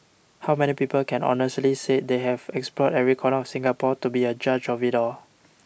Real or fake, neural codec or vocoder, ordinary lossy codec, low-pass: real; none; none; none